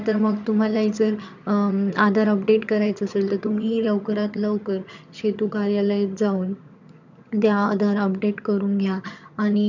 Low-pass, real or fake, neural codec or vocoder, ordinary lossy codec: 7.2 kHz; fake; vocoder, 22.05 kHz, 80 mel bands, HiFi-GAN; none